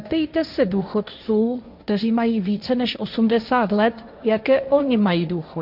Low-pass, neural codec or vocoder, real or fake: 5.4 kHz; codec, 16 kHz, 1.1 kbps, Voila-Tokenizer; fake